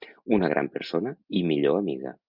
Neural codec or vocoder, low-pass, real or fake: none; 5.4 kHz; real